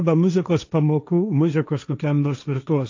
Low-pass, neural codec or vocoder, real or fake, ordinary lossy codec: 7.2 kHz; codec, 16 kHz, 1.1 kbps, Voila-Tokenizer; fake; AAC, 48 kbps